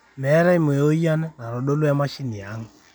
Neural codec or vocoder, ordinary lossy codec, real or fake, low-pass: none; none; real; none